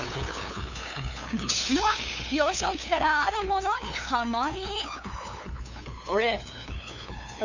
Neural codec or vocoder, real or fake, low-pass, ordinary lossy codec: codec, 16 kHz, 2 kbps, FunCodec, trained on LibriTTS, 25 frames a second; fake; 7.2 kHz; none